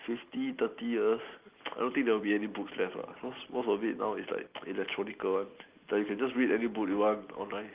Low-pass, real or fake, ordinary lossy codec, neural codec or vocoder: 3.6 kHz; real; Opus, 24 kbps; none